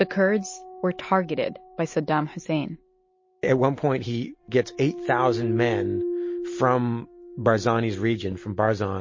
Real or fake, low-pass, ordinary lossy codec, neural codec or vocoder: real; 7.2 kHz; MP3, 32 kbps; none